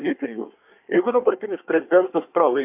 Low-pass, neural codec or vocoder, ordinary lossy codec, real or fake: 3.6 kHz; codec, 24 kHz, 1 kbps, SNAC; AAC, 32 kbps; fake